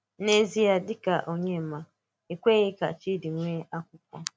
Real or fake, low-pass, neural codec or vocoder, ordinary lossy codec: real; none; none; none